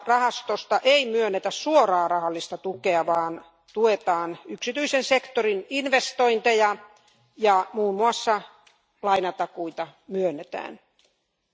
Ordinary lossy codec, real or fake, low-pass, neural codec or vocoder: none; real; none; none